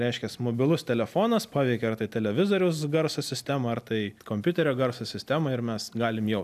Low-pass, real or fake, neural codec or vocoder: 14.4 kHz; real; none